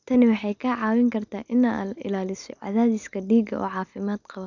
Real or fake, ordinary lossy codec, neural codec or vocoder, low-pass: real; none; none; 7.2 kHz